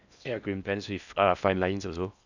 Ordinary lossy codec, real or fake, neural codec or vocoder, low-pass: none; fake; codec, 16 kHz in and 24 kHz out, 0.8 kbps, FocalCodec, streaming, 65536 codes; 7.2 kHz